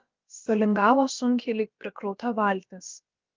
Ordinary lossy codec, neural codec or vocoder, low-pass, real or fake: Opus, 24 kbps; codec, 16 kHz, about 1 kbps, DyCAST, with the encoder's durations; 7.2 kHz; fake